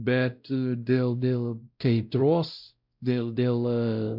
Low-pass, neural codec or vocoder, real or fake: 5.4 kHz; codec, 16 kHz, 0.5 kbps, X-Codec, WavLM features, trained on Multilingual LibriSpeech; fake